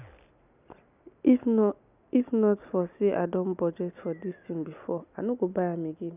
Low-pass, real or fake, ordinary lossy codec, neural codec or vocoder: 3.6 kHz; real; none; none